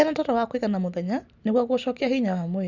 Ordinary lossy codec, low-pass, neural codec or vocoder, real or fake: none; 7.2 kHz; none; real